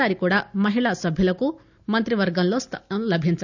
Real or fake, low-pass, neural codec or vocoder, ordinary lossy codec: real; 7.2 kHz; none; none